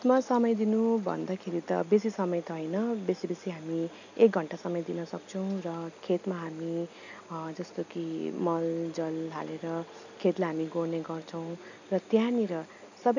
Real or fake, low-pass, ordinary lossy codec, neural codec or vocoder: real; 7.2 kHz; none; none